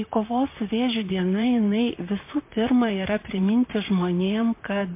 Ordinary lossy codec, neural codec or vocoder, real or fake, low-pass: MP3, 24 kbps; vocoder, 44.1 kHz, 128 mel bands, Pupu-Vocoder; fake; 3.6 kHz